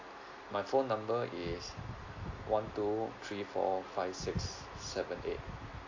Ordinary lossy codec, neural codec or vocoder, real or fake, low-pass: AAC, 48 kbps; none; real; 7.2 kHz